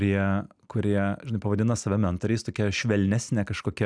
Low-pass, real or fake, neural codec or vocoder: 9.9 kHz; real; none